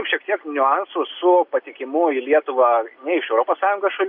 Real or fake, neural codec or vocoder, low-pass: real; none; 5.4 kHz